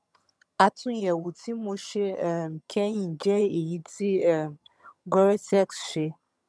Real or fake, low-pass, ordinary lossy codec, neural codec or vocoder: fake; none; none; vocoder, 22.05 kHz, 80 mel bands, HiFi-GAN